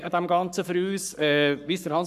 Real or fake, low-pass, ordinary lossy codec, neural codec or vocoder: fake; 14.4 kHz; none; codec, 44.1 kHz, 7.8 kbps, Pupu-Codec